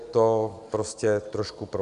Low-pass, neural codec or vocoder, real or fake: 10.8 kHz; none; real